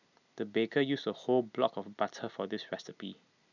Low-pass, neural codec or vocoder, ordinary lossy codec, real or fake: 7.2 kHz; none; none; real